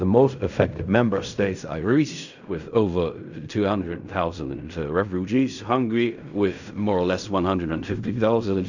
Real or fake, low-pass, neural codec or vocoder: fake; 7.2 kHz; codec, 16 kHz in and 24 kHz out, 0.4 kbps, LongCat-Audio-Codec, fine tuned four codebook decoder